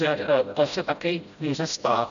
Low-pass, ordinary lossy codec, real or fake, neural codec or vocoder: 7.2 kHz; AAC, 96 kbps; fake; codec, 16 kHz, 0.5 kbps, FreqCodec, smaller model